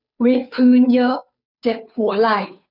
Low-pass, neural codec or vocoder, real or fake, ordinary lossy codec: 5.4 kHz; codec, 16 kHz, 2 kbps, FunCodec, trained on Chinese and English, 25 frames a second; fake; none